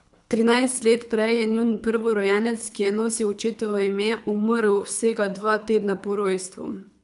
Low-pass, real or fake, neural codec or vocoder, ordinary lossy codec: 10.8 kHz; fake; codec, 24 kHz, 3 kbps, HILCodec; none